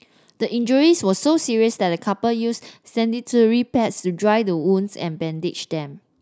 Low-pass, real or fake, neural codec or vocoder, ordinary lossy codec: none; real; none; none